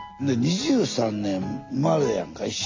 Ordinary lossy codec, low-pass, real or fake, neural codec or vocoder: MP3, 48 kbps; 7.2 kHz; real; none